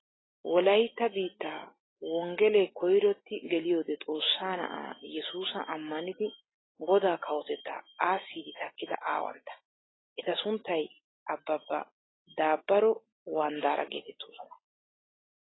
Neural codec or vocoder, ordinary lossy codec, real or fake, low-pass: none; AAC, 16 kbps; real; 7.2 kHz